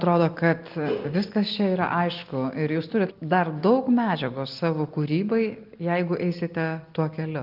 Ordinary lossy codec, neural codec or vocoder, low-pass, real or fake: Opus, 24 kbps; none; 5.4 kHz; real